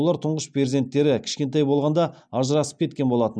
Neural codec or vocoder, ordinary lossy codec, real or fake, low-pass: none; none; real; none